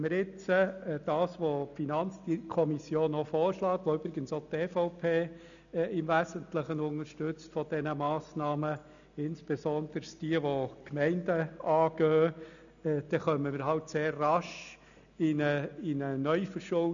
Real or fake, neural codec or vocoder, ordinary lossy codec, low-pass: real; none; none; 7.2 kHz